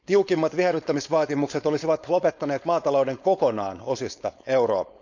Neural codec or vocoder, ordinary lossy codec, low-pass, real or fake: codec, 16 kHz, 4.8 kbps, FACodec; none; 7.2 kHz; fake